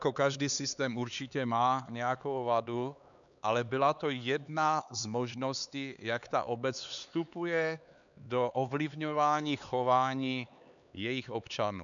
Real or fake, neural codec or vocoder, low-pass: fake; codec, 16 kHz, 4 kbps, X-Codec, HuBERT features, trained on LibriSpeech; 7.2 kHz